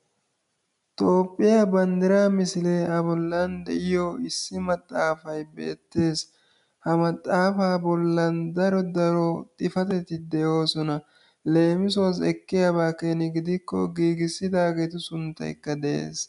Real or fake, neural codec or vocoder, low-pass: real; none; 10.8 kHz